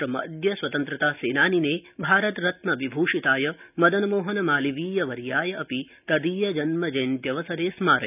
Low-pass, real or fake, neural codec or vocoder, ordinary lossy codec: 3.6 kHz; real; none; none